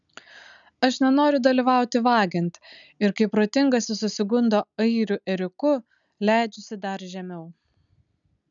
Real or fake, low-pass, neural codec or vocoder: real; 7.2 kHz; none